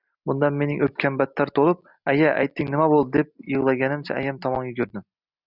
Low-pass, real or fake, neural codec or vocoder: 5.4 kHz; real; none